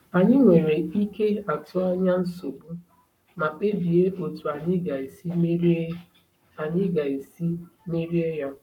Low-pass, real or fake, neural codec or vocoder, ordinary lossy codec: 19.8 kHz; fake; codec, 44.1 kHz, 7.8 kbps, Pupu-Codec; none